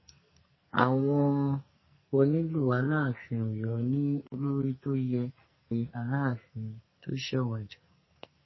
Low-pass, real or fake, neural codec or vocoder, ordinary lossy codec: 7.2 kHz; fake; codec, 44.1 kHz, 2.6 kbps, SNAC; MP3, 24 kbps